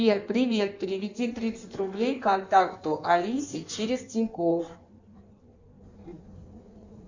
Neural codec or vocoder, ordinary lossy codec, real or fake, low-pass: codec, 16 kHz in and 24 kHz out, 1.1 kbps, FireRedTTS-2 codec; Opus, 64 kbps; fake; 7.2 kHz